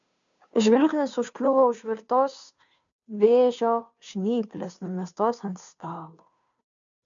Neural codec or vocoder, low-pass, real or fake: codec, 16 kHz, 2 kbps, FunCodec, trained on Chinese and English, 25 frames a second; 7.2 kHz; fake